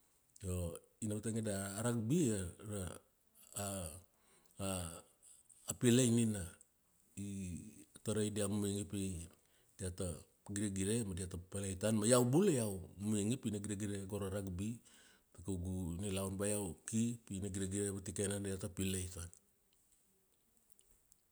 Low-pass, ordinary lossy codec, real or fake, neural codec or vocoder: none; none; real; none